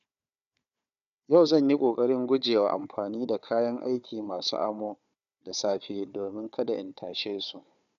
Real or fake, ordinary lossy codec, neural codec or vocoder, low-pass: fake; none; codec, 16 kHz, 4 kbps, FunCodec, trained on Chinese and English, 50 frames a second; 7.2 kHz